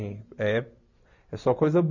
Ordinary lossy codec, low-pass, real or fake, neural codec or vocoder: none; 7.2 kHz; real; none